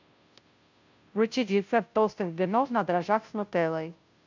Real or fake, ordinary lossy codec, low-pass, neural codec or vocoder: fake; MP3, 64 kbps; 7.2 kHz; codec, 16 kHz, 0.5 kbps, FunCodec, trained on Chinese and English, 25 frames a second